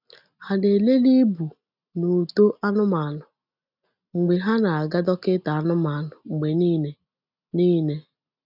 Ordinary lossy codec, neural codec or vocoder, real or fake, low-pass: none; none; real; 5.4 kHz